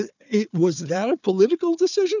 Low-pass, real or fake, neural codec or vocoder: 7.2 kHz; fake; codec, 16 kHz, 4 kbps, FunCodec, trained on Chinese and English, 50 frames a second